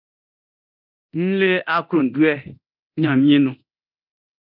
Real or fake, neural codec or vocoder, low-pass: fake; codec, 24 kHz, 0.9 kbps, DualCodec; 5.4 kHz